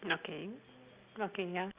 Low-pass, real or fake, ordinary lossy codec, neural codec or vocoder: 3.6 kHz; real; Opus, 64 kbps; none